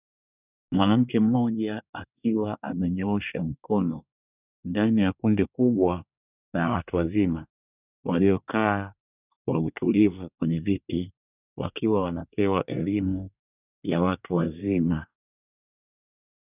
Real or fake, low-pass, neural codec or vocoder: fake; 3.6 kHz; codec, 24 kHz, 1 kbps, SNAC